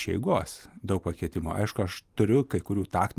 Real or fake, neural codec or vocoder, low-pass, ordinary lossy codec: fake; vocoder, 44.1 kHz, 128 mel bands every 512 samples, BigVGAN v2; 14.4 kHz; Opus, 32 kbps